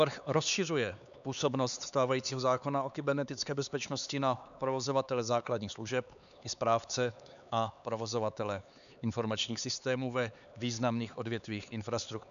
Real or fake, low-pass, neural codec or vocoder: fake; 7.2 kHz; codec, 16 kHz, 4 kbps, X-Codec, HuBERT features, trained on LibriSpeech